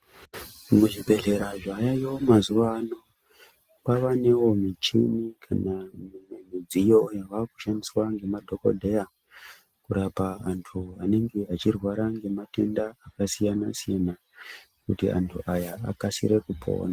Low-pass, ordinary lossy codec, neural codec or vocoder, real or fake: 14.4 kHz; Opus, 24 kbps; none; real